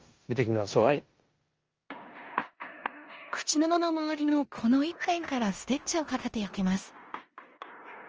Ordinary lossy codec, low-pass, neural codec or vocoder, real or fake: Opus, 24 kbps; 7.2 kHz; codec, 16 kHz in and 24 kHz out, 0.9 kbps, LongCat-Audio-Codec, four codebook decoder; fake